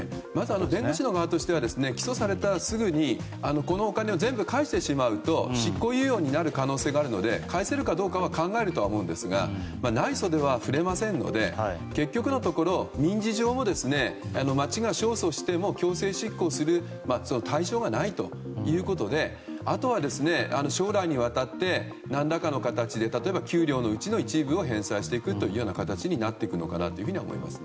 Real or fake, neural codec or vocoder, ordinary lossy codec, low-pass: real; none; none; none